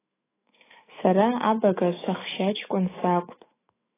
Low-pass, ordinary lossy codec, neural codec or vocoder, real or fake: 3.6 kHz; AAC, 16 kbps; autoencoder, 48 kHz, 128 numbers a frame, DAC-VAE, trained on Japanese speech; fake